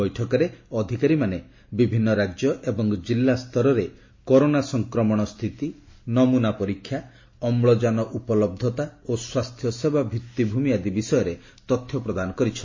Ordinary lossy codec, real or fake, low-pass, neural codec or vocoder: MP3, 32 kbps; real; 7.2 kHz; none